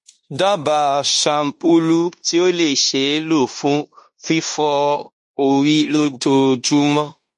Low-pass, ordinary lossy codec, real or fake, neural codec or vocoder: 10.8 kHz; MP3, 48 kbps; fake; codec, 16 kHz in and 24 kHz out, 0.9 kbps, LongCat-Audio-Codec, fine tuned four codebook decoder